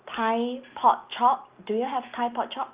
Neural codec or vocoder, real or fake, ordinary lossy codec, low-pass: none; real; Opus, 32 kbps; 3.6 kHz